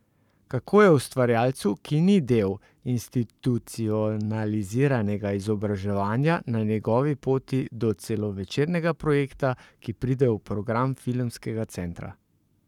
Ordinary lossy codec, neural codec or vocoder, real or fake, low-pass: none; codec, 44.1 kHz, 7.8 kbps, Pupu-Codec; fake; 19.8 kHz